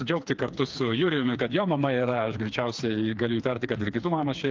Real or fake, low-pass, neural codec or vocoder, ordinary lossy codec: fake; 7.2 kHz; codec, 16 kHz, 4 kbps, FreqCodec, smaller model; Opus, 24 kbps